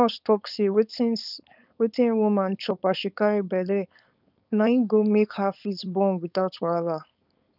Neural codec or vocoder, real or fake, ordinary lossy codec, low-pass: codec, 16 kHz, 4.8 kbps, FACodec; fake; none; 5.4 kHz